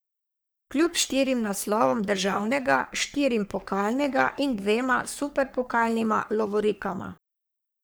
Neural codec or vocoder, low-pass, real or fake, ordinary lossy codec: codec, 44.1 kHz, 3.4 kbps, Pupu-Codec; none; fake; none